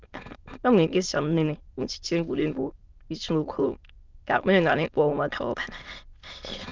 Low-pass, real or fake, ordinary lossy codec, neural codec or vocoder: 7.2 kHz; fake; Opus, 24 kbps; autoencoder, 22.05 kHz, a latent of 192 numbers a frame, VITS, trained on many speakers